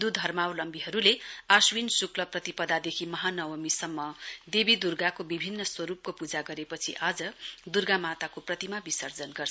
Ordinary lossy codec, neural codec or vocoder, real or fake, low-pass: none; none; real; none